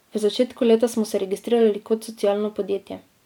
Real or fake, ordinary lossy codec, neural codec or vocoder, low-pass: real; MP3, 96 kbps; none; 19.8 kHz